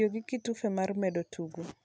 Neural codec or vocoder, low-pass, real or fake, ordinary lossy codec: none; none; real; none